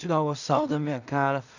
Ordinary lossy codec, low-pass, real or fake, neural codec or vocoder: none; 7.2 kHz; fake; codec, 16 kHz in and 24 kHz out, 0.4 kbps, LongCat-Audio-Codec, two codebook decoder